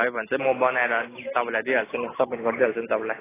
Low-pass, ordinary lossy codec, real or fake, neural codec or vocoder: 3.6 kHz; AAC, 16 kbps; real; none